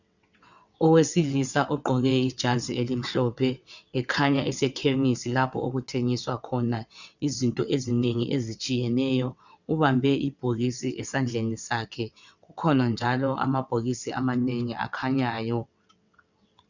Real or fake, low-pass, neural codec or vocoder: fake; 7.2 kHz; vocoder, 22.05 kHz, 80 mel bands, WaveNeXt